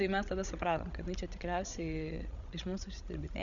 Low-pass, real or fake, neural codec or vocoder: 7.2 kHz; fake; codec, 16 kHz, 16 kbps, FreqCodec, larger model